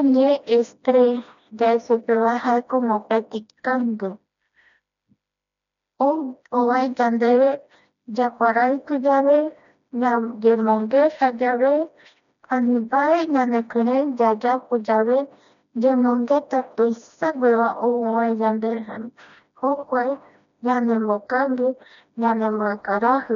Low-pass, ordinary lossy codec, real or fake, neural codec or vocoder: 7.2 kHz; none; fake; codec, 16 kHz, 1 kbps, FreqCodec, smaller model